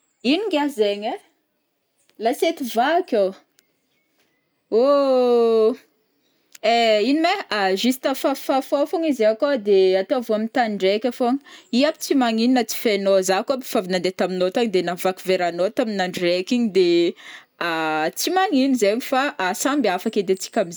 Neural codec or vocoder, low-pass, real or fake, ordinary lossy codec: none; none; real; none